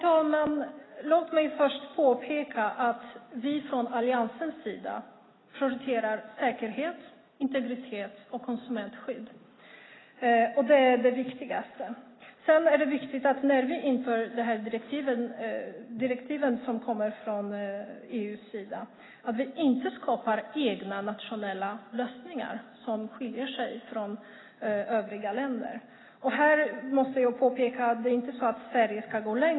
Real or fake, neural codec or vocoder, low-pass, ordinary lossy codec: real; none; 7.2 kHz; AAC, 16 kbps